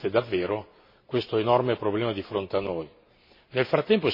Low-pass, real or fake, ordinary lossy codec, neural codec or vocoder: 5.4 kHz; real; MP3, 24 kbps; none